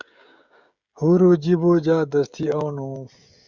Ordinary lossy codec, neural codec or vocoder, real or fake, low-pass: Opus, 64 kbps; codec, 16 kHz, 16 kbps, FreqCodec, smaller model; fake; 7.2 kHz